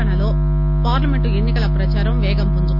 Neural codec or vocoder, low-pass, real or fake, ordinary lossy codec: none; 5.4 kHz; real; none